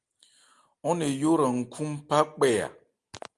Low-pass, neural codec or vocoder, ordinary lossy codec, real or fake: 10.8 kHz; none; Opus, 32 kbps; real